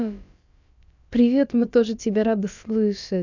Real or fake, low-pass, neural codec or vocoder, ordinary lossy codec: fake; 7.2 kHz; codec, 16 kHz, about 1 kbps, DyCAST, with the encoder's durations; none